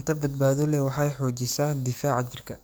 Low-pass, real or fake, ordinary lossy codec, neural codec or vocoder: none; fake; none; codec, 44.1 kHz, 7.8 kbps, DAC